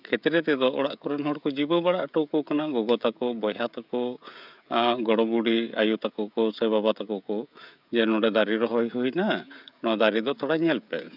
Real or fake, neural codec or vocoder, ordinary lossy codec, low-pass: fake; codec, 16 kHz, 16 kbps, FreqCodec, smaller model; none; 5.4 kHz